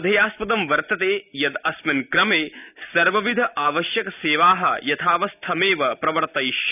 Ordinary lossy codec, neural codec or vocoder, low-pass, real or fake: none; none; 3.6 kHz; real